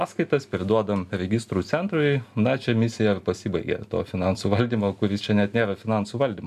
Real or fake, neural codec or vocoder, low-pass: real; none; 14.4 kHz